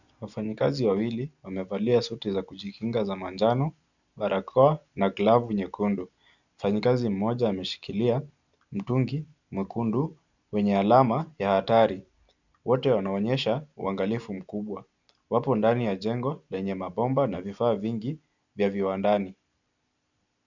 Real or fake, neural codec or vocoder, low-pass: real; none; 7.2 kHz